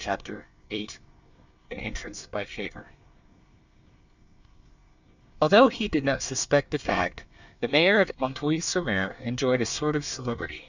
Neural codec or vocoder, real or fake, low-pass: codec, 24 kHz, 1 kbps, SNAC; fake; 7.2 kHz